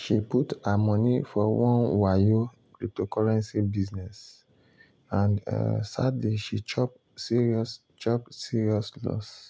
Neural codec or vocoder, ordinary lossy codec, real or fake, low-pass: none; none; real; none